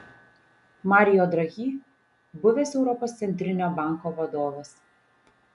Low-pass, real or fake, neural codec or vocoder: 10.8 kHz; real; none